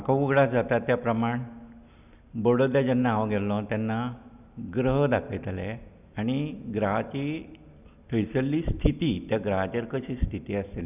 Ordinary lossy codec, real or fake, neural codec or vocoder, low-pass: none; real; none; 3.6 kHz